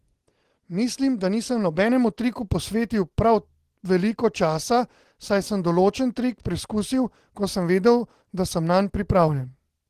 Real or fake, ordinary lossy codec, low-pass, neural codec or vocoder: real; Opus, 16 kbps; 14.4 kHz; none